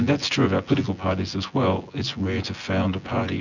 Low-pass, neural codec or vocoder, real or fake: 7.2 kHz; vocoder, 24 kHz, 100 mel bands, Vocos; fake